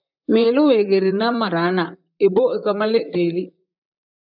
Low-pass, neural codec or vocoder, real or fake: 5.4 kHz; vocoder, 44.1 kHz, 128 mel bands, Pupu-Vocoder; fake